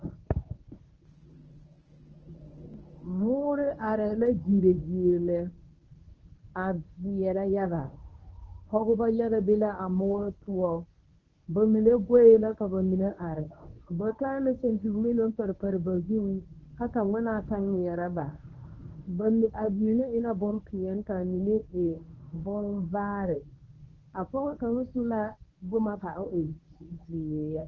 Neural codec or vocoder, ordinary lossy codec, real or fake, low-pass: codec, 24 kHz, 0.9 kbps, WavTokenizer, medium speech release version 1; Opus, 16 kbps; fake; 7.2 kHz